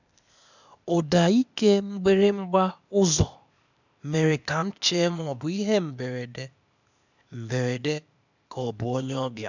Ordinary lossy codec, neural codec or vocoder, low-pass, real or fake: none; codec, 16 kHz, 0.8 kbps, ZipCodec; 7.2 kHz; fake